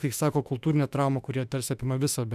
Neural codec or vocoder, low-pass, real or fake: autoencoder, 48 kHz, 32 numbers a frame, DAC-VAE, trained on Japanese speech; 14.4 kHz; fake